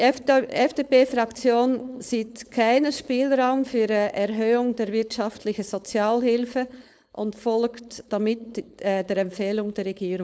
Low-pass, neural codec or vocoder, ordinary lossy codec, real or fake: none; codec, 16 kHz, 4.8 kbps, FACodec; none; fake